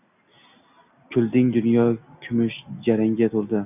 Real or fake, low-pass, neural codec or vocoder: real; 3.6 kHz; none